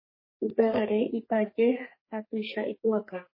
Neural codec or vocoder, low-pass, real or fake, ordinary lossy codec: codec, 44.1 kHz, 2.6 kbps, SNAC; 5.4 kHz; fake; MP3, 24 kbps